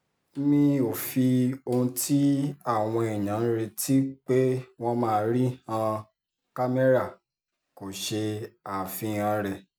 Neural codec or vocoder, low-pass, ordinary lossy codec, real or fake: none; none; none; real